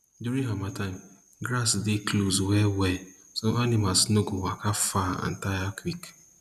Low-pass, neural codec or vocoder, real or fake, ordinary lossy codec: 14.4 kHz; none; real; none